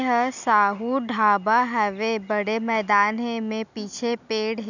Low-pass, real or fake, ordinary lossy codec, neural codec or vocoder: 7.2 kHz; real; none; none